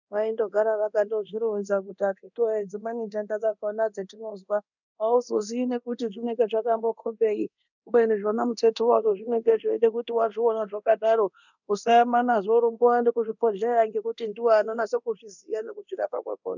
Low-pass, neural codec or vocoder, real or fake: 7.2 kHz; codec, 24 kHz, 0.9 kbps, DualCodec; fake